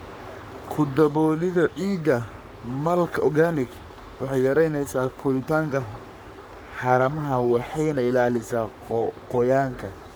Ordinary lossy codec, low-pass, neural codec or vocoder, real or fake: none; none; codec, 44.1 kHz, 3.4 kbps, Pupu-Codec; fake